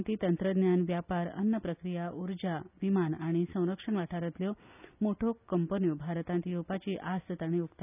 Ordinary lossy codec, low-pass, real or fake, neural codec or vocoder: none; 3.6 kHz; real; none